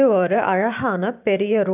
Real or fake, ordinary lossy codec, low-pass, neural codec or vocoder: fake; none; 3.6 kHz; vocoder, 44.1 kHz, 128 mel bands every 512 samples, BigVGAN v2